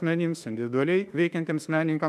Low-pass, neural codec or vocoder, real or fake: 14.4 kHz; autoencoder, 48 kHz, 32 numbers a frame, DAC-VAE, trained on Japanese speech; fake